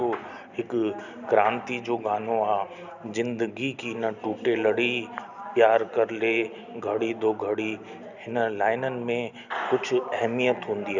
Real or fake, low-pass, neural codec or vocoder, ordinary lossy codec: real; 7.2 kHz; none; none